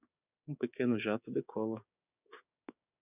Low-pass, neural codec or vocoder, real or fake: 3.6 kHz; codec, 16 kHz, 6 kbps, DAC; fake